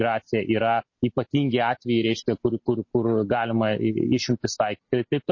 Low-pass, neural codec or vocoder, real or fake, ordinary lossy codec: 7.2 kHz; none; real; MP3, 32 kbps